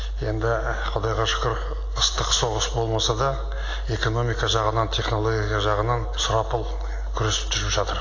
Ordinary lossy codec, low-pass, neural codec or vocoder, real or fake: AAC, 32 kbps; 7.2 kHz; none; real